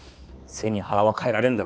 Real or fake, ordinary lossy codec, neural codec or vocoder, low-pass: fake; none; codec, 16 kHz, 2 kbps, X-Codec, HuBERT features, trained on balanced general audio; none